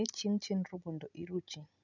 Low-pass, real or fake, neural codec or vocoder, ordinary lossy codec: 7.2 kHz; real; none; none